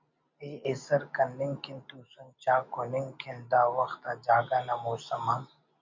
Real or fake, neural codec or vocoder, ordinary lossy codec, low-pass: real; none; MP3, 48 kbps; 7.2 kHz